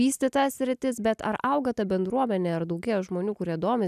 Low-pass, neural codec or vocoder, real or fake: 14.4 kHz; none; real